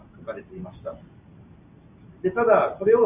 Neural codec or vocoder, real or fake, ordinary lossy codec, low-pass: none; real; Opus, 64 kbps; 3.6 kHz